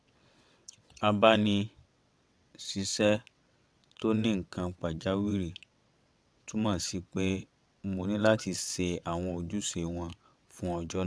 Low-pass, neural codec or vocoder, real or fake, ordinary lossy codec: none; vocoder, 22.05 kHz, 80 mel bands, WaveNeXt; fake; none